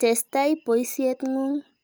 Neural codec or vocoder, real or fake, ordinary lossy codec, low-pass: none; real; none; none